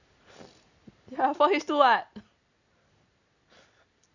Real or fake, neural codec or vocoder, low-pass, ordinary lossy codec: real; none; 7.2 kHz; none